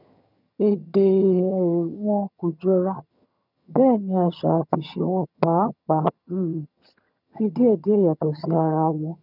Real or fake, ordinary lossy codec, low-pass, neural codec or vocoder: fake; none; 5.4 kHz; vocoder, 22.05 kHz, 80 mel bands, HiFi-GAN